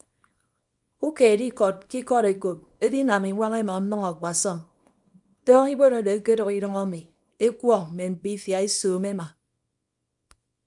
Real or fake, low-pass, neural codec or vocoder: fake; 10.8 kHz; codec, 24 kHz, 0.9 kbps, WavTokenizer, small release